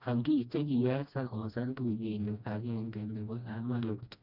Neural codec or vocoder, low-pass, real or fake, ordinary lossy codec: codec, 16 kHz, 1 kbps, FreqCodec, smaller model; 5.4 kHz; fake; none